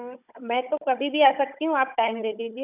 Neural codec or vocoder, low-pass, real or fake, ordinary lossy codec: codec, 16 kHz, 16 kbps, FunCodec, trained on Chinese and English, 50 frames a second; 3.6 kHz; fake; none